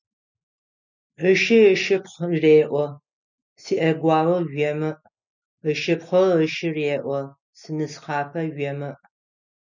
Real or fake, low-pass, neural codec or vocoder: real; 7.2 kHz; none